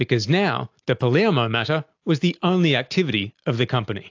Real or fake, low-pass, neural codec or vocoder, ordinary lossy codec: real; 7.2 kHz; none; AAC, 48 kbps